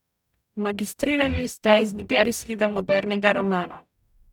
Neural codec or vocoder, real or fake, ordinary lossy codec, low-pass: codec, 44.1 kHz, 0.9 kbps, DAC; fake; none; 19.8 kHz